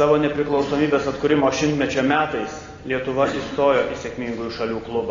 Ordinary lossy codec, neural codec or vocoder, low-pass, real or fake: AAC, 32 kbps; none; 7.2 kHz; real